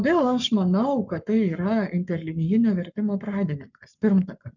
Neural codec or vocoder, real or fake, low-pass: codec, 44.1 kHz, 7.8 kbps, Pupu-Codec; fake; 7.2 kHz